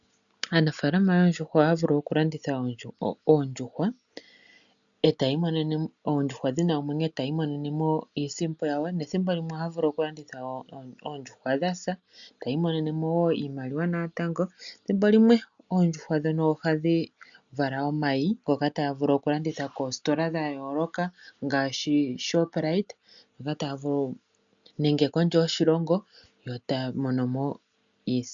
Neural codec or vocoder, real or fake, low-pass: none; real; 7.2 kHz